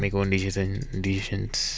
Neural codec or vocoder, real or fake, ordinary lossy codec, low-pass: none; real; none; none